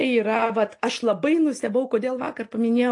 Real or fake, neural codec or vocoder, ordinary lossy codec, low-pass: real; none; AAC, 48 kbps; 10.8 kHz